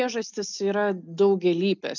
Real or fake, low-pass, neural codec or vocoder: real; 7.2 kHz; none